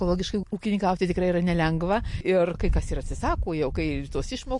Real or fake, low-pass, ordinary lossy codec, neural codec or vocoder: real; 10.8 kHz; MP3, 48 kbps; none